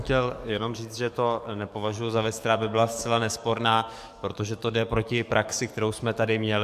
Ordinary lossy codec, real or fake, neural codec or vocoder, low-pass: MP3, 96 kbps; fake; codec, 44.1 kHz, 7.8 kbps, DAC; 14.4 kHz